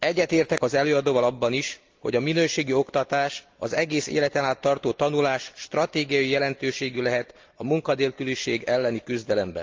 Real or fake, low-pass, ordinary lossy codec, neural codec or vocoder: real; 7.2 kHz; Opus, 24 kbps; none